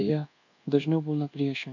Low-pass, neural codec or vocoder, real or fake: 7.2 kHz; codec, 24 kHz, 1.2 kbps, DualCodec; fake